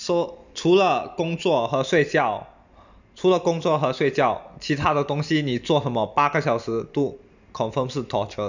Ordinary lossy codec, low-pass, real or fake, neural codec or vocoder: none; 7.2 kHz; real; none